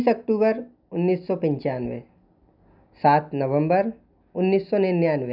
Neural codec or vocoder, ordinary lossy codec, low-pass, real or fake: none; none; 5.4 kHz; real